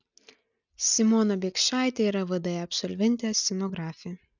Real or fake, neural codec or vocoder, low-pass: real; none; 7.2 kHz